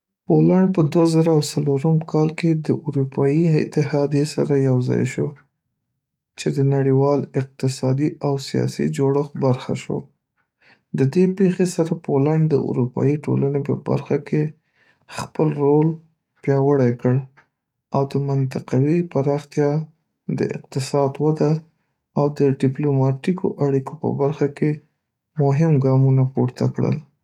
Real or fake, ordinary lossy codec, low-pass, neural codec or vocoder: fake; none; 19.8 kHz; codec, 44.1 kHz, 7.8 kbps, DAC